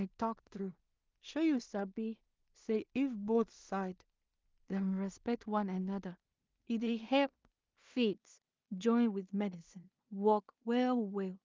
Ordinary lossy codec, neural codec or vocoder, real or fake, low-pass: Opus, 24 kbps; codec, 16 kHz in and 24 kHz out, 0.4 kbps, LongCat-Audio-Codec, two codebook decoder; fake; 7.2 kHz